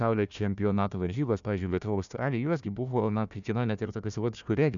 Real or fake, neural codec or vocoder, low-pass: fake; codec, 16 kHz, 1 kbps, FunCodec, trained on Chinese and English, 50 frames a second; 7.2 kHz